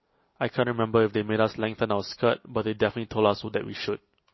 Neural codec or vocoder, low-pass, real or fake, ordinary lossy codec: none; 7.2 kHz; real; MP3, 24 kbps